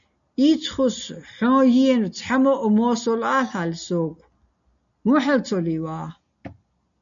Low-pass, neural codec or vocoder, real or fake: 7.2 kHz; none; real